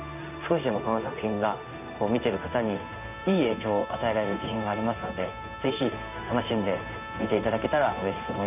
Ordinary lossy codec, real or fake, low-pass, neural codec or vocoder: none; fake; 3.6 kHz; codec, 16 kHz in and 24 kHz out, 1 kbps, XY-Tokenizer